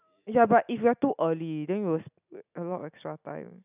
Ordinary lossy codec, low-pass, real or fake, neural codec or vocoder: none; 3.6 kHz; real; none